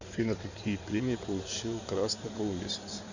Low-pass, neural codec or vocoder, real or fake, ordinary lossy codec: 7.2 kHz; codec, 16 kHz in and 24 kHz out, 2.2 kbps, FireRedTTS-2 codec; fake; Opus, 64 kbps